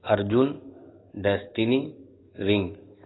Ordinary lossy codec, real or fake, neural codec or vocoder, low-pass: AAC, 16 kbps; fake; codec, 44.1 kHz, 7.8 kbps, DAC; 7.2 kHz